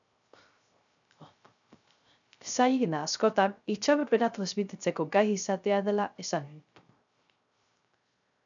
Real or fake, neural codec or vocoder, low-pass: fake; codec, 16 kHz, 0.3 kbps, FocalCodec; 7.2 kHz